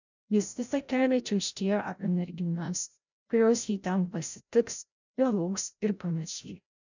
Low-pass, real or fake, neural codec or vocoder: 7.2 kHz; fake; codec, 16 kHz, 0.5 kbps, FreqCodec, larger model